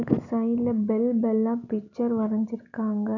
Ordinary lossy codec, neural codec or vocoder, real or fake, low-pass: none; none; real; 7.2 kHz